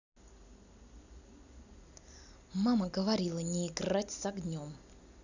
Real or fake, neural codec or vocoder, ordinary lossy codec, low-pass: real; none; none; 7.2 kHz